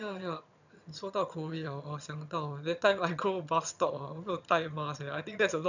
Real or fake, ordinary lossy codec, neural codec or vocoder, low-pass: fake; none; vocoder, 22.05 kHz, 80 mel bands, HiFi-GAN; 7.2 kHz